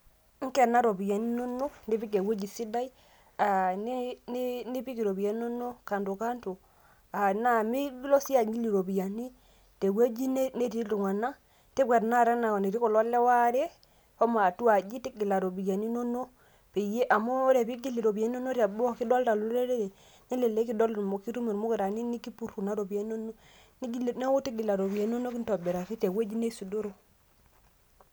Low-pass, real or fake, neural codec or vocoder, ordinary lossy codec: none; real; none; none